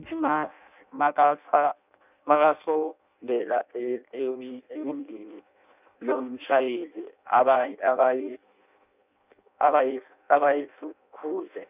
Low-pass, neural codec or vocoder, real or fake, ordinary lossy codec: 3.6 kHz; codec, 16 kHz in and 24 kHz out, 0.6 kbps, FireRedTTS-2 codec; fake; none